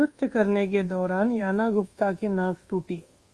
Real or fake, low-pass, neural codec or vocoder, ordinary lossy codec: fake; 10.8 kHz; codec, 24 kHz, 1.2 kbps, DualCodec; Opus, 32 kbps